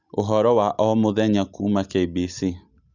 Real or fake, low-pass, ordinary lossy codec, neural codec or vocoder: real; 7.2 kHz; none; none